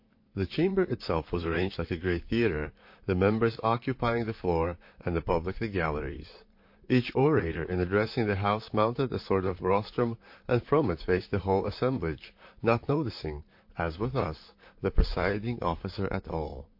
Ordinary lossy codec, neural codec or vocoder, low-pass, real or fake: MP3, 32 kbps; vocoder, 44.1 kHz, 128 mel bands, Pupu-Vocoder; 5.4 kHz; fake